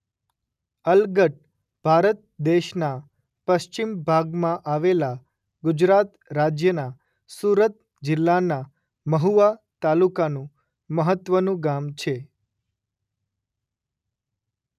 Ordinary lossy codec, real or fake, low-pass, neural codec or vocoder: none; real; 14.4 kHz; none